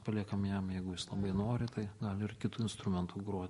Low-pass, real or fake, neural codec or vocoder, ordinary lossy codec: 14.4 kHz; real; none; MP3, 48 kbps